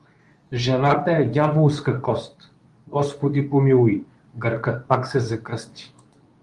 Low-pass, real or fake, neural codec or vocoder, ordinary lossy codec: 10.8 kHz; fake; codec, 24 kHz, 0.9 kbps, WavTokenizer, medium speech release version 2; Opus, 32 kbps